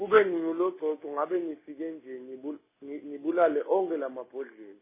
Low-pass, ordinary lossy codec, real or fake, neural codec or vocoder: 3.6 kHz; MP3, 16 kbps; real; none